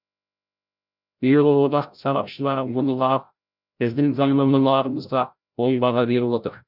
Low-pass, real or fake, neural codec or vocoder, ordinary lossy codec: 5.4 kHz; fake; codec, 16 kHz, 0.5 kbps, FreqCodec, larger model; none